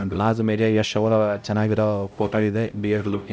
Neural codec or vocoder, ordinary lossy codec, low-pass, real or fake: codec, 16 kHz, 0.5 kbps, X-Codec, HuBERT features, trained on LibriSpeech; none; none; fake